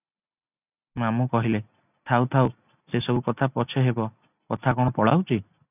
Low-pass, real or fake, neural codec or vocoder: 3.6 kHz; real; none